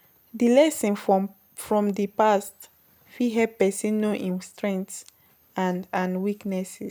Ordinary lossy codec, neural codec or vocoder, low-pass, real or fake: none; none; none; real